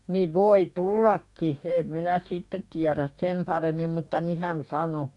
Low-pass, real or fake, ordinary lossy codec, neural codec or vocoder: 10.8 kHz; fake; none; codec, 44.1 kHz, 2.6 kbps, DAC